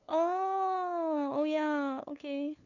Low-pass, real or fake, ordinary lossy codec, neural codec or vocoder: 7.2 kHz; fake; none; codec, 16 kHz, 2 kbps, FunCodec, trained on LibriTTS, 25 frames a second